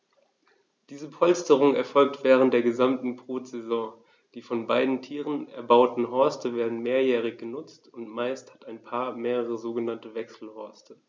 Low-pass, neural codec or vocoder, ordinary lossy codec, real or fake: none; none; none; real